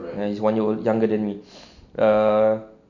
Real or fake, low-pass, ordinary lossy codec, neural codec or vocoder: real; 7.2 kHz; none; none